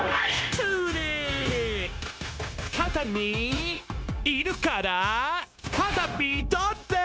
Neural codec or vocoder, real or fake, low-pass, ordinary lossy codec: codec, 16 kHz, 0.9 kbps, LongCat-Audio-Codec; fake; none; none